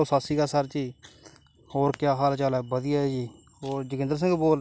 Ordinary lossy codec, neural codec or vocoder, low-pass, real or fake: none; none; none; real